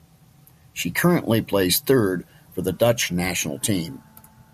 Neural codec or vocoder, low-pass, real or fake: none; 14.4 kHz; real